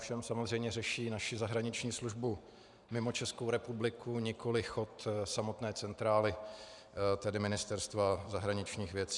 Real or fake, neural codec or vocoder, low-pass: fake; vocoder, 44.1 kHz, 128 mel bands every 512 samples, BigVGAN v2; 10.8 kHz